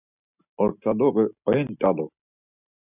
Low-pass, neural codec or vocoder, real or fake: 3.6 kHz; none; real